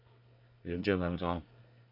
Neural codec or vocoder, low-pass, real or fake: codec, 24 kHz, 1 kbps, SNAC; 5.4 kHz; fake